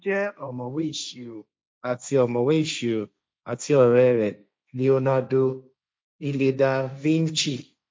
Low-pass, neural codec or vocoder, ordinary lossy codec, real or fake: none; codec, 16 kHz, 1.1 kbps, Voila-Tokenizer; none; fake